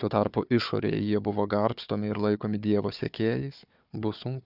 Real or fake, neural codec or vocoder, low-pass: fake; codec, 16 kHz, 4 kbps, FunCodec, trained on Chinese and English, 50 frames a second; 5.4 kHz